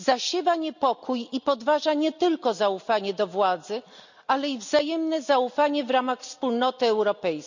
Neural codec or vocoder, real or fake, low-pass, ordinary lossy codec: none; real; 7.2 kHz; none